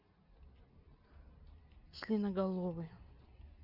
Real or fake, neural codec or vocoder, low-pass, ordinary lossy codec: real; none; 5.4 kHz; none